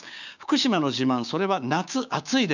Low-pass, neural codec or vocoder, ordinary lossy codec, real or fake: 7.2 kHz; codec, 16 kHz, 6 kbps, DAC; none; fake